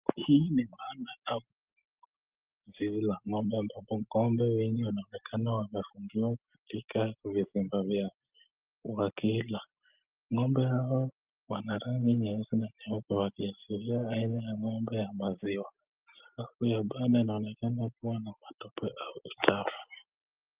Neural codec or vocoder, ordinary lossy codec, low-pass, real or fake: none; Opus, 24 kbps; 3.6 kHz; real